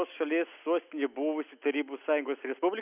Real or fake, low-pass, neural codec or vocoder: real; 3.6 kHz; none